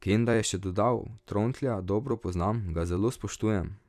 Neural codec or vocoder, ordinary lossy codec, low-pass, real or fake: vocoder, 44.1 kHz, 128 mel bands every 256 samples, BigVGAN v2; none; 14.4 kHz; fake